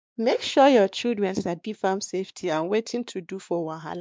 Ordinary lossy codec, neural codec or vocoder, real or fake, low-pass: none; codec, 16 kHz, 2 kbps, X-Codec, WavLM features, trained on Multilingual LibriSpeech; fake; none